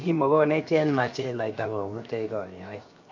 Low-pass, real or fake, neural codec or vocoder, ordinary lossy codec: 7.2 kHz; fake; codec, 16 kHz, 0.7 kbps, FocalCodec; MP3, 48 kbps